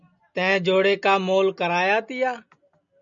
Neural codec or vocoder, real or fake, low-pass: none; real; 7.2 kHz